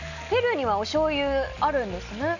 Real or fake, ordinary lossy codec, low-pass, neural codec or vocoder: real; none; 7.2 kHz; none